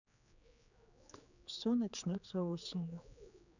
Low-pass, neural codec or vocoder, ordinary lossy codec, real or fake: 7.2 kHz; codec, 16 kHz, 4 kbps, X-Codec, HuBERT features, trained on general audio; none; fake